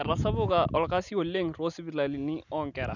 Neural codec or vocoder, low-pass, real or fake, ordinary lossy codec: none; 7.2 kHz; real; none